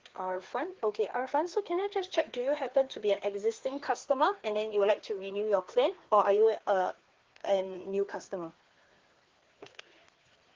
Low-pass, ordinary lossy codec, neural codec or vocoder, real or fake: 7.2 kHz; Opus, 16 kbps; codec, 16 kHz, 2 kbps, FreqCodec, larger model; fake